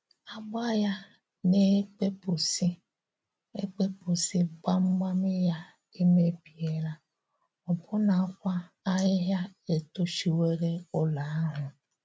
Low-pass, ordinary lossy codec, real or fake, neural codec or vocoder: none; none; real; none